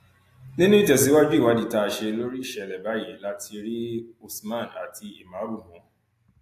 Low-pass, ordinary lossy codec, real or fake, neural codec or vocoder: 14.4 kHz; AAC, 64 kbps; real; none